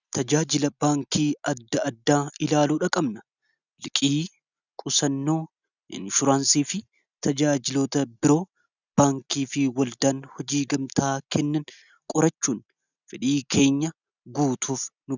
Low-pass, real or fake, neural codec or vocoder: 7.2 kHz; real; none